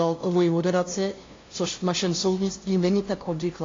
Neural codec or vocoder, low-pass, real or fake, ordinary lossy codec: codec, 16 kHz, 0.5 kbps, FunCodec, trained on LibriTTS, 25 frames a second; 7.2 kHz; fake; AAC, 32 kbps